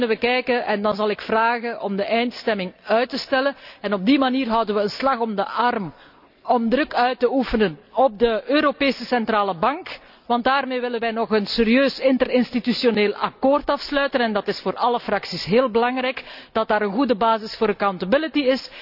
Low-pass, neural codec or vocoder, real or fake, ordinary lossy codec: 5.4 kHz; none; real; none